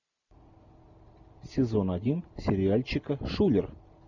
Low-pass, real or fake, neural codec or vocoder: 7.2 kHz; real; none